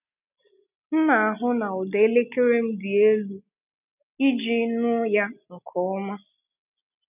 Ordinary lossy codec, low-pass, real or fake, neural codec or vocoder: none; 3.6 kHz; real; none